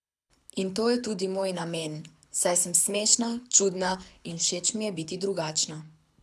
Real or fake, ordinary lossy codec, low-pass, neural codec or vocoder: fake; none; none; codec, 24 kHz, 6 kbps, HILCodec